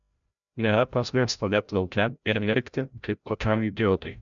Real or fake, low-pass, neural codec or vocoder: fake; 7.2 kHz; codec, 16 kHz, 0.5 kbps, FreqCodec, larger model